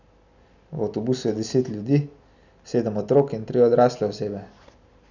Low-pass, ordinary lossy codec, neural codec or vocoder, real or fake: 7.2 kHz; none; none; real